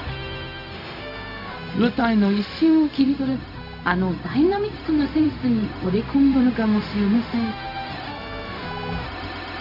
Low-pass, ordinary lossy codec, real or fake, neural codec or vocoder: 5.4 kHz; none; fake; codec, 16 kHz, 0.4 kbps, LongCat-Audio-Codec